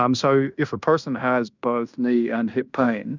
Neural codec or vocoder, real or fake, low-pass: codec, 16 kHz in and 24 kHz out, 0.9 kbps, LongCat-Audio-Codec, fine tuned four codebook decoder; fake; 7.2 kHz